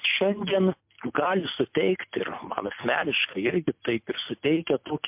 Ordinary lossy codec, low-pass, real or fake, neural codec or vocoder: MP3, 32 kbps; 3.6 kHz; real; none